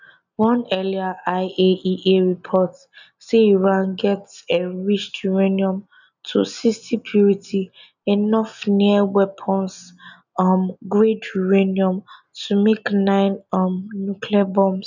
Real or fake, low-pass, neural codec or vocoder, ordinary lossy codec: real; 7.2 kHz; none; none